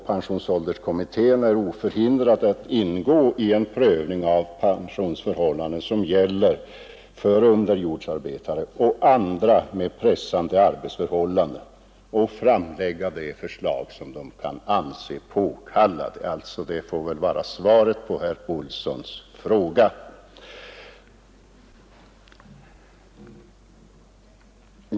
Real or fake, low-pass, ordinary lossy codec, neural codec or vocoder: real; none; none; none